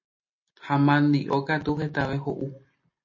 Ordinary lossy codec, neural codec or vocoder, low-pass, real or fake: MP3, 32 kbps; none; 7.2 kHz; real